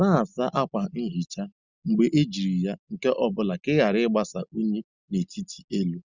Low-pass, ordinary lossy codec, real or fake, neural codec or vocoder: 7.2 kHz; Opus, 64 kbps; real; none